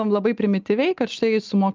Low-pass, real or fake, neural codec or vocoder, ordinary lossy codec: 7.2 kHz; real; none; Opus, 24 kbps